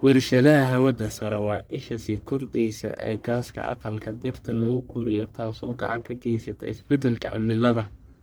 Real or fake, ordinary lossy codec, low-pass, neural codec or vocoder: fake; none; none; codec, 44.1 kHz, 1.7 kbps, Pupu-Codec